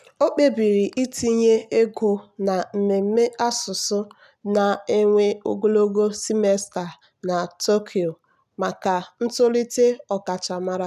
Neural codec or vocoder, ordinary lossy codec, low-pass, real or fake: none; none; 14.4 kHz; real